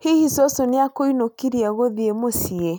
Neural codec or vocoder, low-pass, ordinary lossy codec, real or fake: none; none; none; real